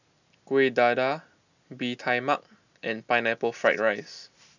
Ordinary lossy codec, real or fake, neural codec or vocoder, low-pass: none; real; none; 7.2 kHz